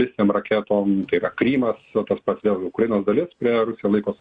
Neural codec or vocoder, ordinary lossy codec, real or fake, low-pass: none; Opus, 64 kbps; real; 9.9 kHz